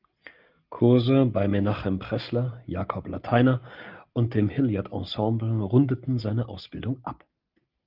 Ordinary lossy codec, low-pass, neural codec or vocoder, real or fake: Opus, 32 kbps; 5.4 kHz; none; real